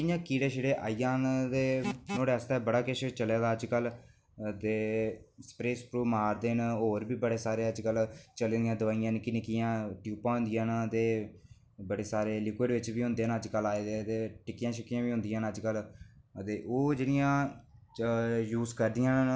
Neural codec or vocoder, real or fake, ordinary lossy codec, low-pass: none; real; none; none